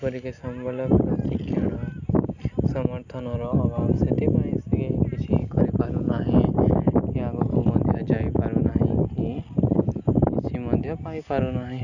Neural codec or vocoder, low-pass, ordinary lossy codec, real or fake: none; 7.2 kHz; none; real